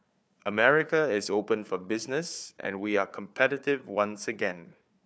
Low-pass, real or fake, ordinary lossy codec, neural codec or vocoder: none; fake; none; codec, 16 kHz, 4 kbps, FunCodec, trained on Chinese and English, 50 frames a second